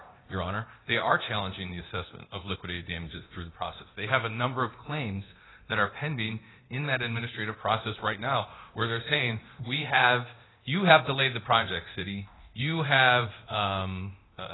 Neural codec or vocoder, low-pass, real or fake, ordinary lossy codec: codec, 24 kHz, 0.9 kbps, DualCodec; 7.2 kHz; fake; AAC, 16 kbps